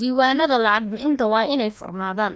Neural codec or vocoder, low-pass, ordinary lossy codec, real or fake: codec, 16 kHz, 1 kbps, FreqCodec, larger model; none; none; fake